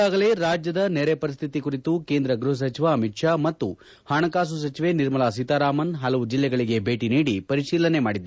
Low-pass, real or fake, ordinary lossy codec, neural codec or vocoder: none; real; none; none